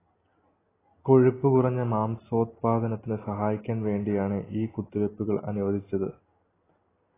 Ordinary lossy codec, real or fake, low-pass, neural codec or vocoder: AAC, 16 kbps; real; 3.6 kHz; none